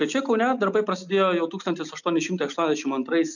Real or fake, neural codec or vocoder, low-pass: real; none; 7.2 kHz